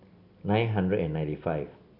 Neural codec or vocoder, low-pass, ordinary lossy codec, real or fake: none; 5.4 kHz; none; real